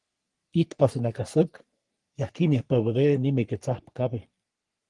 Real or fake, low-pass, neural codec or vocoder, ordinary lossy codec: fake; 10.8 kHz; codec, 44.1 kHz, 3.4 kbps, Pupu-Codec; Opus, 24 kbps